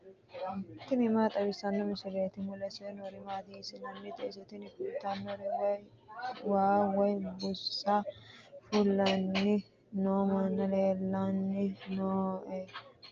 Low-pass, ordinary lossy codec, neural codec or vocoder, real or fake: 7.2 kHz; Opus, 24 kbps; none; real